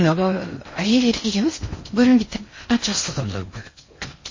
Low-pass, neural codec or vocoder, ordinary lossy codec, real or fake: 7.2 kHz; codec, 16 kHz in and 24 kHz out, 0.6 kbps, FocalCodec, streaming, 4096 codes; MP3, 32 kbps; fake